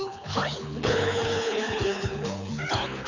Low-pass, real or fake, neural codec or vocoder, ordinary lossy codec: 7.2 kHz; fake; codec, 24 kHz, 6 kbps, HILCodec; none